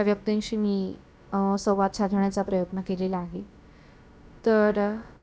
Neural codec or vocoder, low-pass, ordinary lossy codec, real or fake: codec, 16 kHz, about 1 kbps, DyCAST, with the encoder's durations; none; none; fake